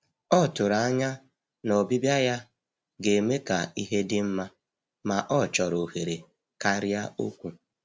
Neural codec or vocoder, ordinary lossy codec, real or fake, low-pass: none; none; real; none